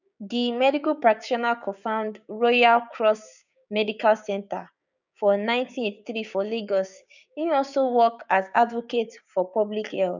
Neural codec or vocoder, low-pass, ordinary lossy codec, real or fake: codec, 16 kHz, 6 kbps, DAC; 7.2 kHz; none; fake